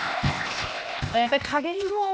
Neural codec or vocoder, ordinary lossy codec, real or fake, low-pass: codec, 16 kHz, 0.8 kbps, ZipCodec; none; fake; none